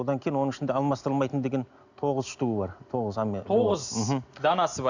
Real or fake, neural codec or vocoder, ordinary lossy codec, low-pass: real; none; none; 7.2 kHz